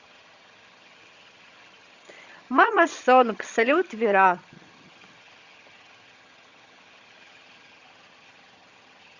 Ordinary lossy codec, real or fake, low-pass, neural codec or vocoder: Opus, 64 kbps; fake; 7.2 kHz; vocoder, 22.05 kHz, 80 mel bands, HiFi-GAN